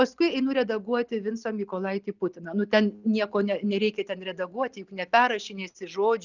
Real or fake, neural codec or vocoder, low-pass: real; none; 7.2 kHz